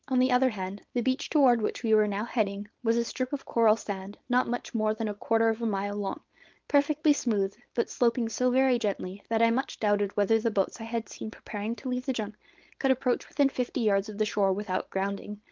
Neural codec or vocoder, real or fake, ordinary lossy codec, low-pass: codec, 16 kHz, 4.8 kbps, FACodec; fake; Opus, 32 kbps; 7.2 kHz